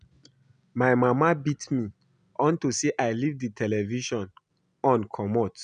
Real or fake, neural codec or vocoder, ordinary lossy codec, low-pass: real; none; none; 9.9 kHz